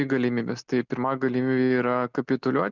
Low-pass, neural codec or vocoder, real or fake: 7.2 kHz; none; real